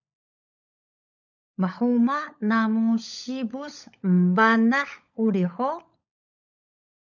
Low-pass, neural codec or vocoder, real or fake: 7.2 kHz; codec, 16 kHz, 16 kbps, FunCodec, trained on LibriTTS, 50 frames a second; fake